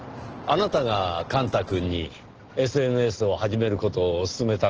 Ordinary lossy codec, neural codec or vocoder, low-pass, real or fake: Opus, 16 kbps; none; 7.2 kHz; real